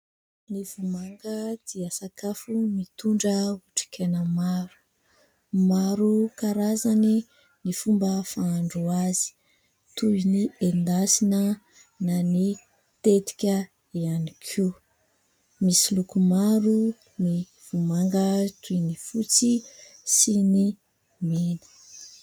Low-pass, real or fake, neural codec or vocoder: 19.8 kHz; real; none